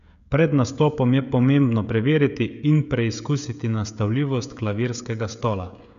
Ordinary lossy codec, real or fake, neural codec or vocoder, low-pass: none; fake; codec, 16 kHz, 16 kbps, FreqCodec, smaller model; 7.2 kHz